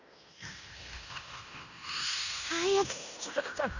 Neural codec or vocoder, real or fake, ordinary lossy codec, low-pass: codec, 16 kHz in and 24 kHz out, 0.9 kbps, LongCat-Audio-Codec, fine tuned four codebook decoder; fake; none; 7.2 kHz